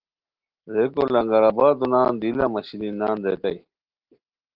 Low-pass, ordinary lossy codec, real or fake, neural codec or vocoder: 5.4 kHz; Opus, 16 kbps; real; none